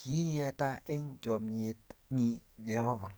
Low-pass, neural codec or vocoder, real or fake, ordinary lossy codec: none; codec, 44.1 kHz, 2.6 kbps, SNAC; fake; none